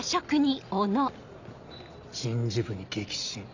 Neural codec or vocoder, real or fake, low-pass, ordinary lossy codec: none; real; 7.2 kHz; none